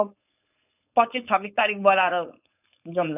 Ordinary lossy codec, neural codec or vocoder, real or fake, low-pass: none; codec, 16 kHz, 4.8 kbps, FACodec; fake; 3.6 kHz